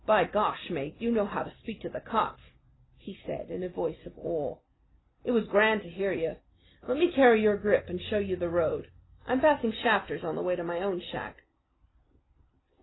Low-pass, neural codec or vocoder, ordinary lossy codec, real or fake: 7.2 kHz; none; AAC, 16 kbps; real